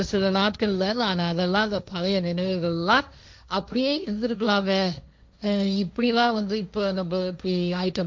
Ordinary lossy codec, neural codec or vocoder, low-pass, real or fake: none; codec, 16 kHz, 1.1 kbps, Voila-Tokenizer; none; fake